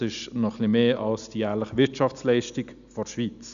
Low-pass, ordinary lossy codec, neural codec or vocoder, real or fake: 7.2 kHz; none; none; real